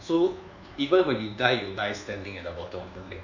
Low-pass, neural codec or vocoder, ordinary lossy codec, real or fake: 7.2 kHz; codec, 24 kHz, 1.2 kbps, DualCodec; none; fake